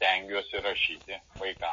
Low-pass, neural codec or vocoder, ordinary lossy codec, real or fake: 7.2 kHz; none; MP3, 32 kbps; real